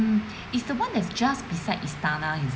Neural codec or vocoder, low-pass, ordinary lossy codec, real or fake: none; none; none; real